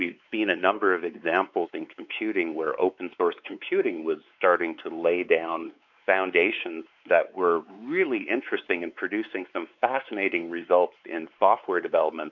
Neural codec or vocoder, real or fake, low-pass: codec, 16 kHz, 4 kbps, X-Codec, WavLM features, trained on Multilingual LibriSpeech; fake; 7.2 kHz